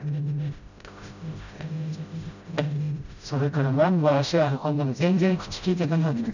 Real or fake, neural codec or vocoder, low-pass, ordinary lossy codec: fake; codec, 16 kHz, 0.5 kbps, FreqCodec, smaller model; 7.2 kHz; none